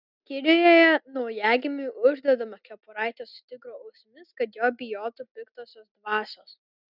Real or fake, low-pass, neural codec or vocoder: real; 5.4 kHz; none